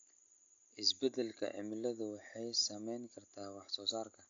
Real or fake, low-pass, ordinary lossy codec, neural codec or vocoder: real; 7.2 kHz; AAC, 48 kbps; none